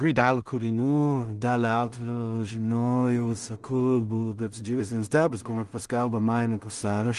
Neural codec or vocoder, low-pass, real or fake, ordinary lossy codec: codec, 16 kHz in and 24 kHz out, 0.4 kbps, LongCat-Audio-Codec, two codebook decoder; 10.8 kHz; fake; Opus, 24 kbps